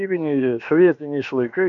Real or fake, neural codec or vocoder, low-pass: fake; codec, 16 kHz, about 1 kbps, DyCAST, with the encoder's durations; 7.2 kHz